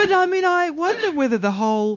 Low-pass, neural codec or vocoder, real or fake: 7.2 kHz; codec, 16 kHz, 0.9 kbps, LongCat-Audio-Codec; fake